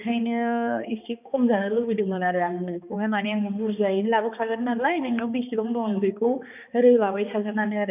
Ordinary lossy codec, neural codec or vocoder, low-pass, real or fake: none; codec, 16 kHz, 2 kbps, X-Codec, HuBERT features, trained on balanced general audio; 3.6 kHz; fake